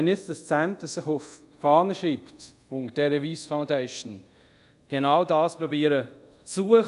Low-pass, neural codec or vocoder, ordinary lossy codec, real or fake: 10.8 kHz; codec, 24 kHz, 0.5 kbps, DualCodec; none; fake